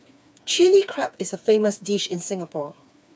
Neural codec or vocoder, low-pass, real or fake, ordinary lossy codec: codec, 16 kHz, 4 kbps, FreqCodec, smaller model; none; fake; none